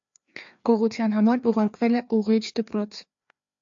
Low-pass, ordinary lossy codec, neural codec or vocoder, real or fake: 7.2 kHz; AAC, 64 kbps; codec, 16 kHz, 2 kbps, FreqCodec, larger model; fake